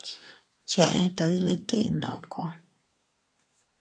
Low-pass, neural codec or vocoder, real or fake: 9.9 kHz; codec, 24 kHz, 1 kbps, SNAC; fake